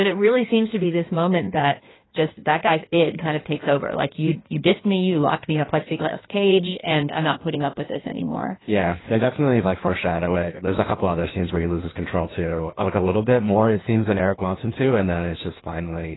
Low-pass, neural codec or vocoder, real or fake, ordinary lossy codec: 7.2 kHz; codec, 16 kHz in and 24 kHz out, 1.1 kbps, FireRedTTS-2 codec; fake; AAC, 16 kbps